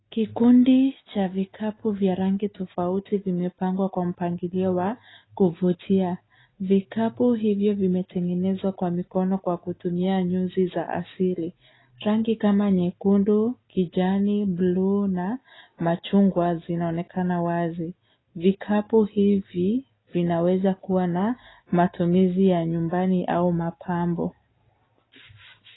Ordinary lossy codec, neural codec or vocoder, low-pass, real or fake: AAC, 16 kbps; none; 7.2 kHz; real